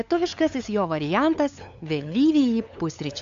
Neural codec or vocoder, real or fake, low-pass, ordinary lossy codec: codec, 16 kHz, 8 kbps, FunCodec, trained on LibriTTS, 25 frames a second; fake; 7.2 kHz; AAC, 64 kbps